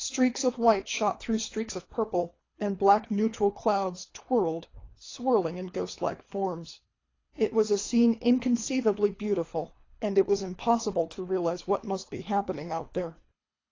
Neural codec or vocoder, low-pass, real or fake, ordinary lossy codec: codec, 24 kHz, 3 kbps, HILCodec; 7.2 kHz; fake; AAC, 32 kbps